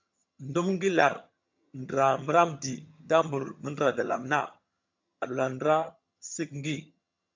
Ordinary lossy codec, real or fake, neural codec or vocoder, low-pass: MP3, 64 kbps; fake; vocoder, 22.05 kHz, 80 mel bands, HiFi-GAN; 7.2 kHz